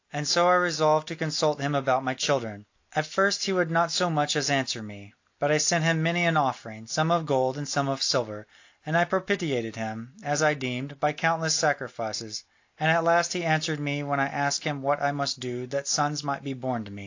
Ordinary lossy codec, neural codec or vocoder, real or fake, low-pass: AAC, 48 kbps; none; real; 7.2 kHz